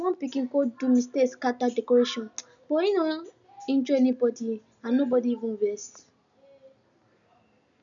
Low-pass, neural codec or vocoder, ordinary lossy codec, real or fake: 7.2 kHz; none; none; real